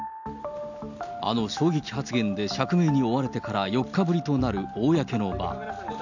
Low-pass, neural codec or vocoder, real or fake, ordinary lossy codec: 7.2 kHz; none; real; none